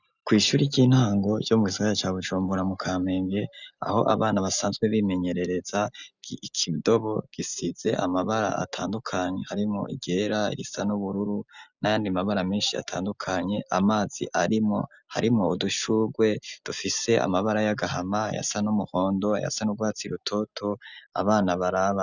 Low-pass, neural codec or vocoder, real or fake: 7.2 kHz; none; real